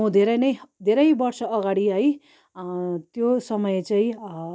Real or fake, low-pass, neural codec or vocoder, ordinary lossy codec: real; none; none; none